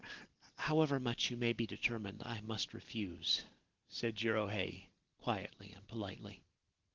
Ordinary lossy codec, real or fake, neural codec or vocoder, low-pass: Opus, 16 kbps; real; none; 7.2 kHz